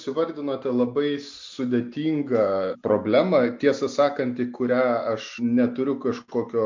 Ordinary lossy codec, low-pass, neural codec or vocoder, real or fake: MP3, 48 kbps; 7.2 kHz; none; real